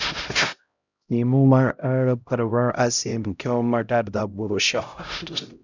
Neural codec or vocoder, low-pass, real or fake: codec, 16 kHz, 0.5 kbps, X-Codec, HuBERT features, trained on LibriSpeech; 7.2 kHz; fake